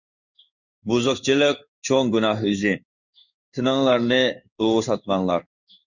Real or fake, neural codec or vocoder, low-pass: fake; codec, 16 kHz in and 24 kHz out, 1 kbps, XY-Tokenizer; 7.2 kHz